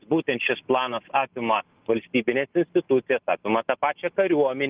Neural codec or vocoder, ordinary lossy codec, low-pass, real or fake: none; Opus, 32 kbps; 3.6 kHz; real